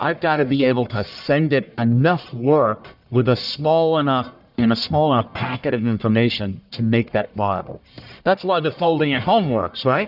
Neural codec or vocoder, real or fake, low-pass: codec, 44.1 kHz, 1.7 kbps, Pupu-Codec; fake; 5.4 kHz